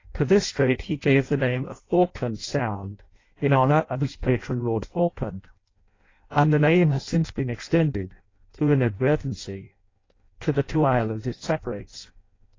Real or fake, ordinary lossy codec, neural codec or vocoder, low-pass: fake; AAC, 32 kbps; codec, 16 kHz in and 24 kHz out, 0.6 kbps, FireRedTTS-2 codec; 7.2 kHz